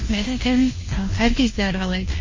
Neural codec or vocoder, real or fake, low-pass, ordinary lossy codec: codec, 16 kHz, 1 kbps, FunCodec, trained on LibriTTS, 50 frames a second; fake; 7.2 kHz; MP3, 32 kbps